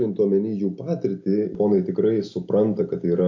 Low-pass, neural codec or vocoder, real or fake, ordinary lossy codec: 7.2 kHz; none; real; MP3, 48 kbps